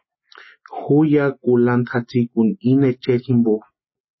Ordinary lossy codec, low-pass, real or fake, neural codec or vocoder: MP3, 24 kbps; 7.2 kHz; real; none